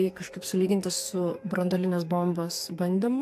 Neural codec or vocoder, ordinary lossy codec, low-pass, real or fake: codec, 44.1 kHz, 2.6 kbps, SNAC; AAC, 64 kbps; 14.4 kHz; fake